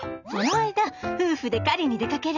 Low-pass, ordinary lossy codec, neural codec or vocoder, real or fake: 7.2 kHz; none; none; real